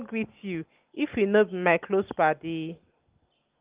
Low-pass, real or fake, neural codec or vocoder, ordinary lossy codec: 3.6 kHz; real; none; Opus, 32 kbps